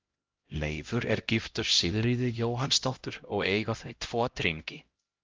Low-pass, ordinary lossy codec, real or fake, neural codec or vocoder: 7.2 kHz; Opus, 32 kbps; fake; codec, 16 kHz, 0.5 kbps, X-Codec, HuBERT features, trained on LibriSpeech